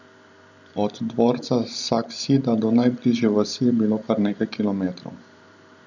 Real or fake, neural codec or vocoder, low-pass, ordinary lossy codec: fake; vocoder, 44.1 kHz, 128 mel bands every 512 samples, BigVGAN v2; 7.2 kHz; none